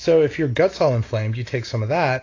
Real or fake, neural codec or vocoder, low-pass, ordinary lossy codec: real; none; 7.2 kHz; AAC, 32 kbps